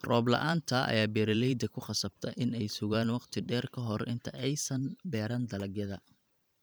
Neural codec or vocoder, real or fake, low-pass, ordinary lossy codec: vocoder, 44.1 kHz, 128 mel bands every 512 samples, BigVGAN v2; fake; none; none